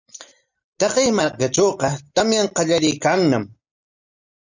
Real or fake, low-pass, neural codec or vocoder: real; 7.2 kHz; none